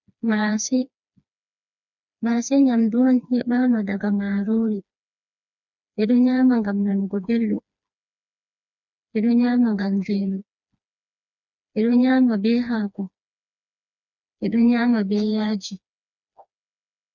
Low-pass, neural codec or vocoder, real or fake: 7.2 kHz; codec, 16 kHz, 2 kbps, FreqCodec, smaller model; fake